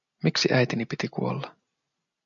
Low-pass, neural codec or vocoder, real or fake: 7.2 kHz; none; real